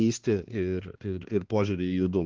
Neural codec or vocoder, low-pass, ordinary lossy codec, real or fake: codec, 16 kHz, 2 kbps, X-Codec, HuBERT features, trained on balanced general audio; 7.2 kHz; Opus, 16 kbps; fake